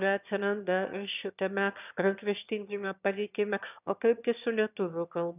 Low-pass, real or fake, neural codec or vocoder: 3.6 kHz; fake; autoencoder, 22.05 kHz, a latent of 192 numbers a frame, VITS, trained on one speaker